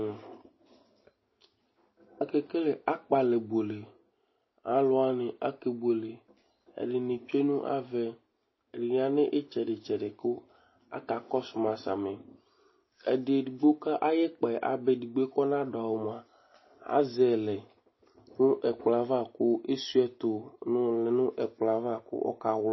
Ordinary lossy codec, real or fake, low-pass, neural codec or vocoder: MP3, 24 kbps; real; 7.2 kHz; none